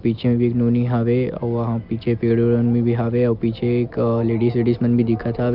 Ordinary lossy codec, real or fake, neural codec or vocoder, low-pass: Opus, 24 kbps; real; none; 5.4 kHz